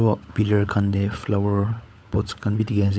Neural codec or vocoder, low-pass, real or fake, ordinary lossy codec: codec, 16 kHz, 16 kbps, FunCodec, trained on LibriTTS, 50 frames a second; none; fake; none